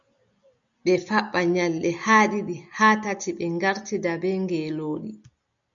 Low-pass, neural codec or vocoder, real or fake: 7.2 kHz; none; real